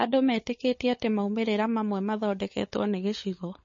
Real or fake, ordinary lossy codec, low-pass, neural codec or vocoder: fake; MP3, 32 kbps; 7.2 kHz; codec, 16 kHz, 16 kbps, FunCodec, trained on LibriTTS, 50 frames a second